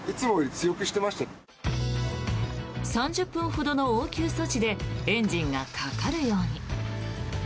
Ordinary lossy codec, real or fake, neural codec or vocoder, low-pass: none; real; none; none